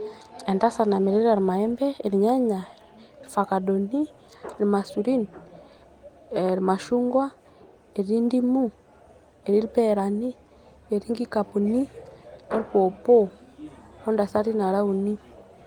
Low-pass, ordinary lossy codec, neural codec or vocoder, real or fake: 19.8 kHz; Opus, 24 kbps; none; real